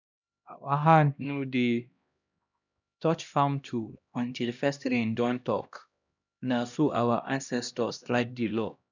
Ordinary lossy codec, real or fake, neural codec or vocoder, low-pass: none; fake; codec, 16 kHz, 1 kbps, X-Codec, HuBERT features, trained on LibriSpeech; 7.2 kHz